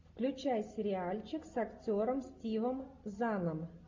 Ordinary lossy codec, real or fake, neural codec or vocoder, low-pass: MP3, 48 kbps; real; none; 7.2 kHz